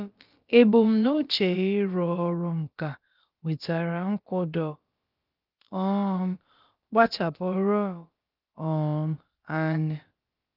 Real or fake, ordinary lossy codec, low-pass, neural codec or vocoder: fake; Opus, 32 kbps; 5.4 kHz; codec, 16 kHz, about 1 kbps, DyCAST, with the encoder's durations